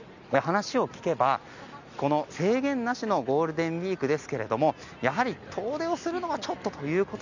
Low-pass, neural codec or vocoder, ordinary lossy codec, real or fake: 7.2 kHz; none; none; real